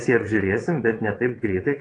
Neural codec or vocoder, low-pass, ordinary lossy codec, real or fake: vocoder, 22.05 kHz, 80 mel bands, WaveNeXt; 9.9 kHz; AAC, 32 kbps; fake